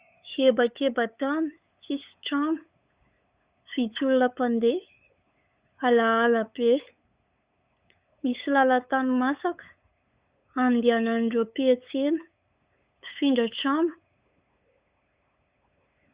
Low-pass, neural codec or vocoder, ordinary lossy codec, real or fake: 3.6 kHz; codec, 16 kHz, 8 kbps, FunCodec, trained on LibriTTS, 25 frames a second; Opus, 64 kbps; fake